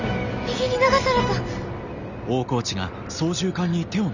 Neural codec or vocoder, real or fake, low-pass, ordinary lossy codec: none; real; 7.2 kHz; none